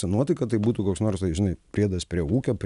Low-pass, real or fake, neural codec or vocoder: 10.8 kHz; real; none